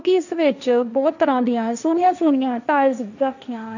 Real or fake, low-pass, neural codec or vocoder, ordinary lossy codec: fake; 7.2 kHz; codec, 16 kHz, 1.1 kbps, Voila-Tokenizer; none